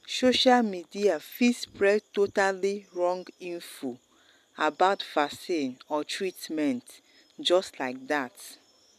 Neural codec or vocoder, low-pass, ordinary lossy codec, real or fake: none; 14.4 kHz; MP3, 96 kbps; real